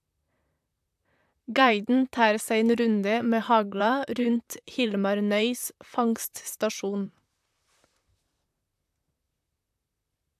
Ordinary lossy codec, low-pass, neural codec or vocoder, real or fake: AAC, 96 kbps; 14.4 kHz; vocoder, 44.1 kHz, 128 mel bands, Pupu-Vocoder; fake